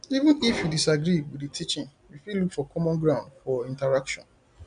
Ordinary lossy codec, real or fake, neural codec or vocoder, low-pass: none; real; none; 9.9 kHz